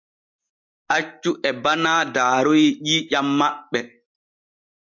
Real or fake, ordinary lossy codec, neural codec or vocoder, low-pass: real; MP3, 64 kbps; none; 7.2 kHz